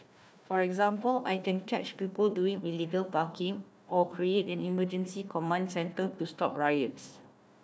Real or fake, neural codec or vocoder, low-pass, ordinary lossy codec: fake; codec, 16 kHz, 1 kbps, FunCodec, trained on Chinese and English, 50 frames a second; none; none